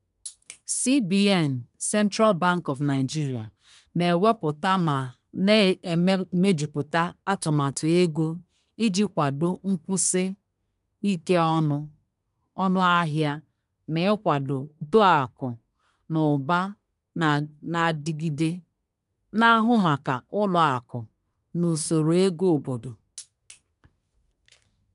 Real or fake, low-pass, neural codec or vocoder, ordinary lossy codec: fake; 10.8 kHz; codec, 24 kHz, 1 kbps, SNAC; none